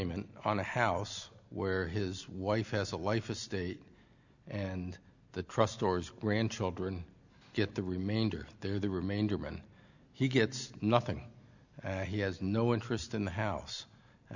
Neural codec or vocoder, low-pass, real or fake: none; 7.2 kHz; real